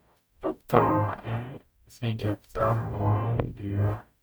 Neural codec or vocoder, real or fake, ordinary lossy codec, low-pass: codec, 44.1 kHz, 0.9 kbps, DAC; fake; none; none